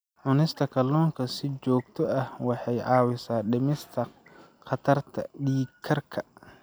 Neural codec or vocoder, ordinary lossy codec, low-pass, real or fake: none; none; none; real